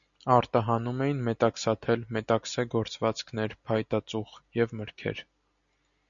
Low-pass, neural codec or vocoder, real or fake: 7.2 kHz; none; real